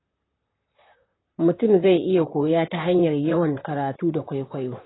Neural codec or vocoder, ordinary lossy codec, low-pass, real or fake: vocoder, 44.1 kHz, 128 mel bands, Pupu-Vocoder; AAC, 16 kbps; 7.2 kHz; fake